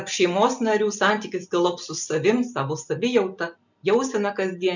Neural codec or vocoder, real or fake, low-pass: none; real; 7.2 kHz